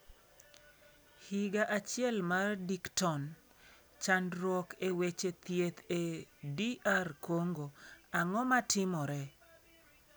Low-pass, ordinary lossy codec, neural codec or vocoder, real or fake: none; none; none; real